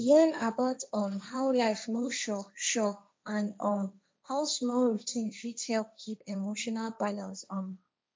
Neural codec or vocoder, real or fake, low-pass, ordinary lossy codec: codec, 16 kHz, 1.1 kbps, Voila-Tokenizer; fake; none; none